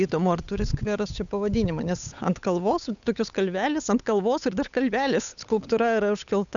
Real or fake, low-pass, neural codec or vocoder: fake; 7.2 kHz; codec, 16 kHz, 8 kbps, FunCodec, trained on Chinese and English, 25 frames a second